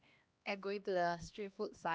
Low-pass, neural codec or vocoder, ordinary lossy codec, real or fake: none; codec, 16 kHz, 1 kbps, X-Codec, HuBERT features, trained on LibriSpeech; none; fake